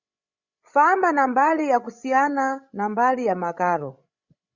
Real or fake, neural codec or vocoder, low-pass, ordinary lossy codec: fake; codec, 16 kHz, 16 kbps, FreqCodec, larger model; 7.2 kHz; Opus, 64 kbps